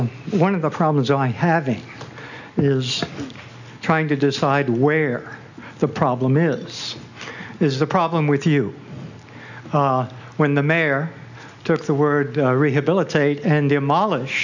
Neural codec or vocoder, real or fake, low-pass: none; real; 7.2 kHz